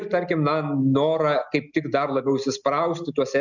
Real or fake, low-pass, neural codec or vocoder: real; 7.2 kHz; none